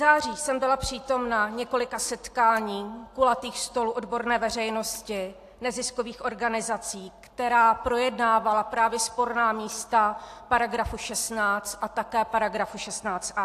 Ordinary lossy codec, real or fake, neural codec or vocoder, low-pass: AAC, 64 kbps; real; none; 14.4 kHz